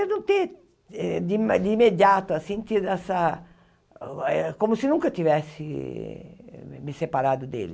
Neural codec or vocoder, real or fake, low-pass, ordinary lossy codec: none; real; none; none